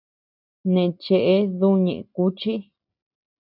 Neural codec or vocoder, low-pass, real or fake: none; 5.4 kHz; real